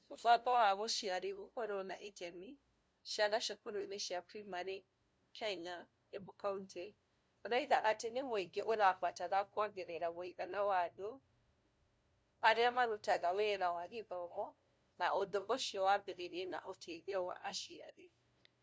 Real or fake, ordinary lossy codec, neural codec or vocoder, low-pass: fake; none; codec, 16 kHz, 0.5 kbps, FunCodec, trained on LibriTTS, 25 frames a second; none